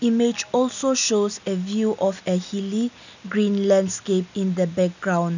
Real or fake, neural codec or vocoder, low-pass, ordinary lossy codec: real; none; 7.2 kHz; none